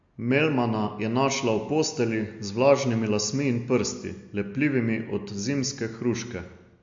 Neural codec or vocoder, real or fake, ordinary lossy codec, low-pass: none; real; MP3, 48 kbps; 7.2 kHz